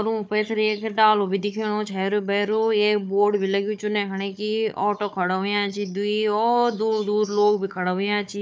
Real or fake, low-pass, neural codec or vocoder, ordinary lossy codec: fake; none; codec, 16 kHz, 4 kbps, FunCodec, trained on Chinese and English, 50 frames a second; none